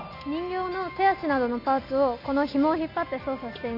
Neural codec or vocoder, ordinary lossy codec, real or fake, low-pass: none; none; real; 5.4 kHz